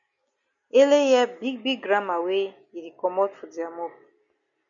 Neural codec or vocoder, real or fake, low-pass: none; real; 7.2 kHz